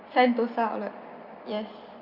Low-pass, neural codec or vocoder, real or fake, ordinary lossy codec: 5.4 kHz; none; real; none